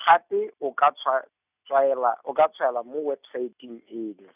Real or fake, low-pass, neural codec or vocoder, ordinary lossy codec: real; 3.6 kHz; none; none